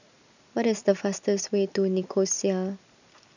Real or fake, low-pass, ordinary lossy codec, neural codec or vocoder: real; 7.2 kHz; none; none